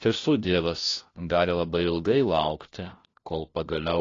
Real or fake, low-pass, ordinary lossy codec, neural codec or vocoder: fake; 7.2 kHz; AAC, 32 kbps; codec, 16 kHz, 1 kbps, FunCodec, trained on LibriTTS, 50 frames a second